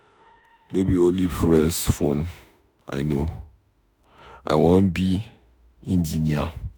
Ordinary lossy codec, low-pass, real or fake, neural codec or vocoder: none; none; fake; autoencoder, 48 kHz, 32 numbers a frame, DAC-VAE, trained on Japanese speech